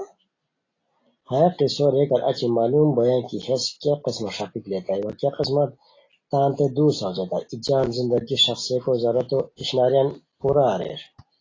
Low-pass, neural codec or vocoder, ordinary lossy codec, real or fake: 7.2 kHz; none; AAC, 32 kbps; real